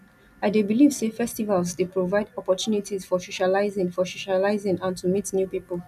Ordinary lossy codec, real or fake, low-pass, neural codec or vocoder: none; real; 14.4 kHz; none